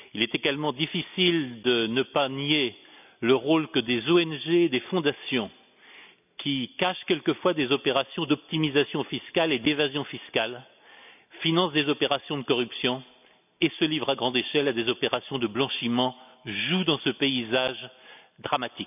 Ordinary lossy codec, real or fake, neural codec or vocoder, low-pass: none; real; none; 3.6 kHz